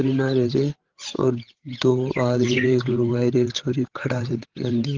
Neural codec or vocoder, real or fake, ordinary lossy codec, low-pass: codec, 16 kHz, 8 kbps, FreqCodec, larger model; fake; Opus, 16 kbps; 7.2 kHz